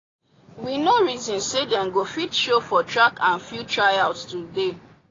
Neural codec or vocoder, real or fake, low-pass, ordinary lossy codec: none; real; 7.2 kHz; AAC, 32 kbps